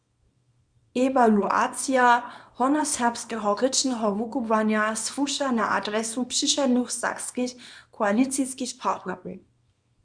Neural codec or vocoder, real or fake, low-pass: codec, 24 kHz, 0.9 kbps, WavTokenizer, small release; fake; 9.9 kHz